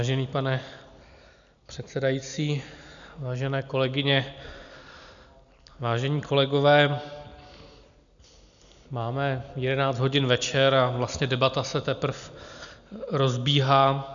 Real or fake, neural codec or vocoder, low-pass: real; none; 7.2 kHz